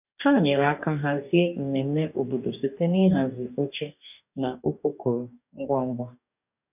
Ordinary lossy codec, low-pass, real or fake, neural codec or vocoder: none; 3.6 kHz; fake; codec, 44.1 kHz, 2.6 kbps, DAC